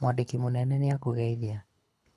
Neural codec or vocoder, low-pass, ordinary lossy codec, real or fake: codec, 24 kHz, 6 kbps, HILCodec; none; none; fake